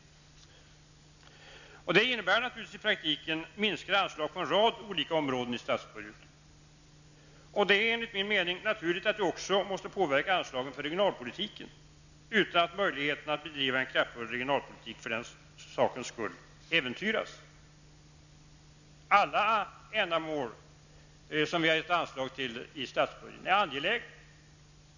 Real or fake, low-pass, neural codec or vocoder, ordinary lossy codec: real; 7.2 kHz; none; none